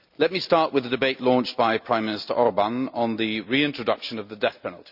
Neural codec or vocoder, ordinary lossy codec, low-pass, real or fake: none; none; 5.4 kHz; real